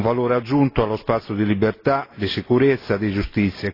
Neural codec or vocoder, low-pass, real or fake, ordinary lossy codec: none; 5.4 kHz; real; AAC, 24 kbps